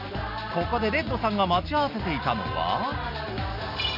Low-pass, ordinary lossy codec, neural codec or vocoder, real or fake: 5.4 kHz; none; none; real